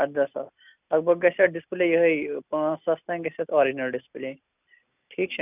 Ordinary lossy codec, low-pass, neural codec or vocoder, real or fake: none; 3.6 kHz; none; real